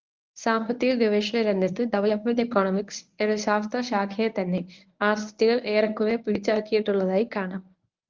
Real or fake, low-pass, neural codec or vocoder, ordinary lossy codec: fake; 7.2 kHz; codec, 24 kHz, 0.9 kbps, WavTokenizer, medium speech release version 1; Opus, 32 kbps